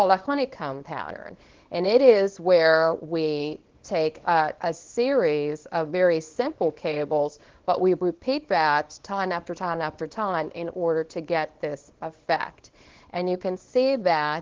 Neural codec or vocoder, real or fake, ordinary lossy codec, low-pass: codec, 24 kHz, 0.9 kbps, WavTokenizer, small release; fake; Opus, 16 kbps; 7.2 kHz